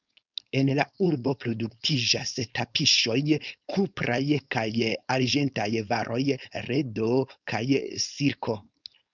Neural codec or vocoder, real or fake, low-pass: codec, 16 kHz, 4.8 kbps, FACodec; fake; 7.2 kHz